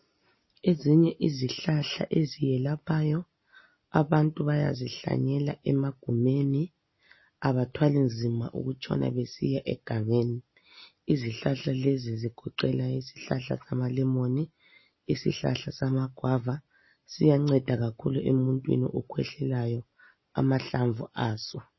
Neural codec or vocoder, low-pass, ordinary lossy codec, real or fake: none; 7.2 kHz; MP3, 24 kbps; real